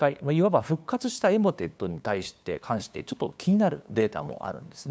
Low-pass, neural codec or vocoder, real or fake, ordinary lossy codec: none; codec, 16 kHz, 2 kbps, FunCodec, trained on LibriTTS, 25 frames a second; fake; none